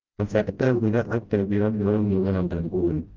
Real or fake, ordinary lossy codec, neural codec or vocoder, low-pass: fake; Opus, 24 kbps; codec, 16 kHz, 0.5 kbps, FreqCodec, smaller model; 7.2 kHz